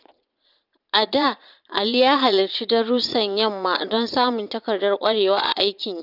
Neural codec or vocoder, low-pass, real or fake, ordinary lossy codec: vocoder, 22.05 kHz, 80 mel bands, Vocos; 5.4 kHz; fake; none